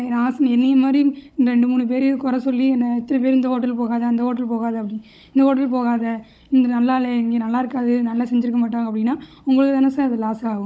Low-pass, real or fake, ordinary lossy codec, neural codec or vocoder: none; fake; none; codec, 16 kHz, 16 kbps, FunCodec, trained on Chinese and English, 50 frames a second